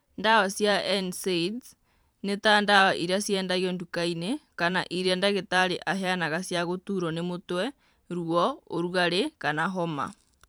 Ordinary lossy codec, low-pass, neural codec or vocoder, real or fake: none; none; vocoder, 44.1 kHz, 128 mel bands every 512 samples, BigVGAN v2; fake